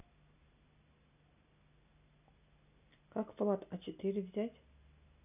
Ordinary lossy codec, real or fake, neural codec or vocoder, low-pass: none; real; none; 3.6 kHz